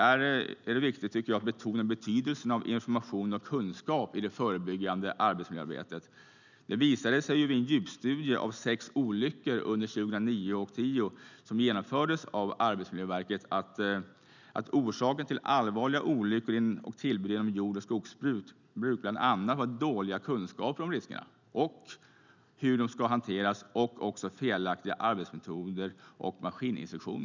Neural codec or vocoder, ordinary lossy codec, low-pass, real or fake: none; none; 7.2 kHz; real